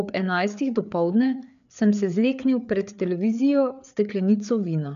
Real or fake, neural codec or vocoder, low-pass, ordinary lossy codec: fake; codec, 16 kHz, 4 kbps, FreqCodec, larger model; 7.2 kHz; none